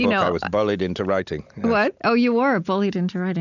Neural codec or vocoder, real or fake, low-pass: none; real; 7.2 kHz